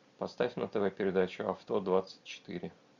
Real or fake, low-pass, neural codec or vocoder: real; 7.2 kHz; none